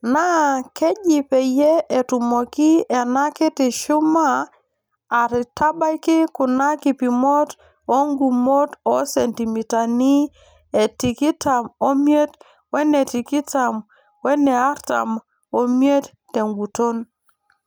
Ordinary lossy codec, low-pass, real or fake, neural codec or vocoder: none; none; real; none